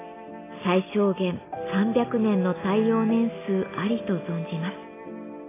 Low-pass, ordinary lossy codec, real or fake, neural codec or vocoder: 3.6 kHz; AAC, 16 kbps; real; none